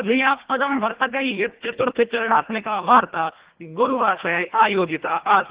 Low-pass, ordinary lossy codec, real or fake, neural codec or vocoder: 3.6 kHz; Opus, 16 kbps; fake; codec, 24 kHz, 1.5 kbps, HILCodec